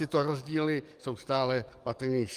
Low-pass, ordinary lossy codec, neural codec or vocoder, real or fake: 14.4 kHz; Opus, 32 kbps; codec, 44.1 kHz, 7.8 kbps, DAC; fake